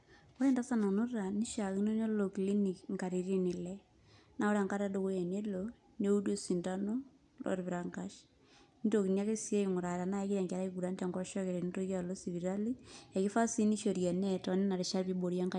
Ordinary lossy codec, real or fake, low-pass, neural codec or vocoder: none; real; 10.8 kHz; none